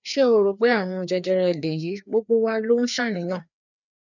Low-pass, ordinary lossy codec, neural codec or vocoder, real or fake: 7.2 kHz; none; codec, 16 kHz, 2 kbps, FreqCodec, larger model; fake